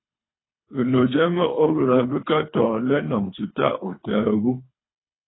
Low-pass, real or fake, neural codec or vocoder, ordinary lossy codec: 7.2 kHz; fake; codec, 24 kHz, 3 kbps, HILCodec; AAC, 16 kbps